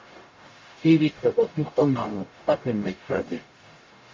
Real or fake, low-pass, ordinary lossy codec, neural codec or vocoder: fake; 7.2 kHz; MP3, 32 kbps; codec, 44.1 kHz, 0.9 kbps, DAC